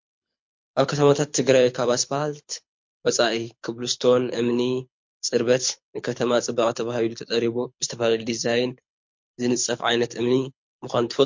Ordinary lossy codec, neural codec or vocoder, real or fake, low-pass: MP3, 48 kbps; codec, 24 kHz, 6 kbps, HILCodec; fake; 7.2 kHz